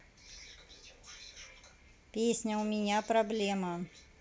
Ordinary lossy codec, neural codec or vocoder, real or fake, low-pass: none; none; real; none